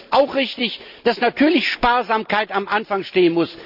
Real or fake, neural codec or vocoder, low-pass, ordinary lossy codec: real; none; 5.4 kHz; none